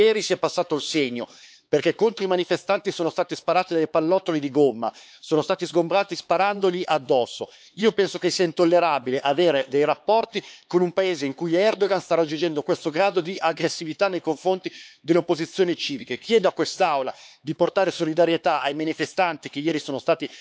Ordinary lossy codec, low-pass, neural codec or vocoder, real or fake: none; none; codec, 16 kHz, 4 kbps, X-Codec, HuBERT features, trained on LibriSpeech; fake